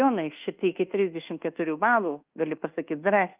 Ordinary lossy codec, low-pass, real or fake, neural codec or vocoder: Opus, 24 kbps; 3.6 kHz; fake; codec, 24 kHz, 1.2 kbps, DualCodec